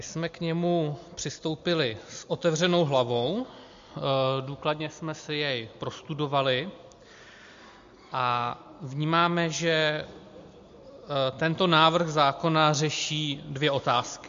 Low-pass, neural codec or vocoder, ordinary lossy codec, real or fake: 7.2 kHz; none; MP3, 48 kbps; real